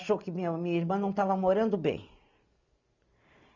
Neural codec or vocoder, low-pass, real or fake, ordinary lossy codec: none; 7.2 kHz; real; none